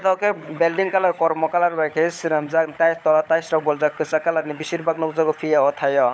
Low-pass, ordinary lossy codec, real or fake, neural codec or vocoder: none; none; fake; codec, 16 kHz, 16 kbps, FunCodec, trained on LibriTTS, 50 frames a second